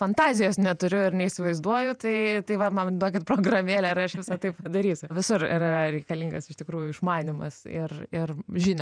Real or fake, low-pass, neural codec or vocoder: fake; 9.9 kHz; vocoder, 48 kHz, 128 mel bands, Vocos